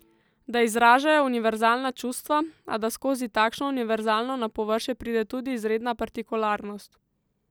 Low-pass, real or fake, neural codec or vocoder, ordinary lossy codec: none; real; none; none